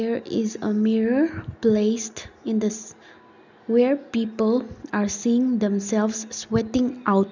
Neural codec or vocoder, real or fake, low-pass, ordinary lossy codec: none; real; 7.2 kHz; none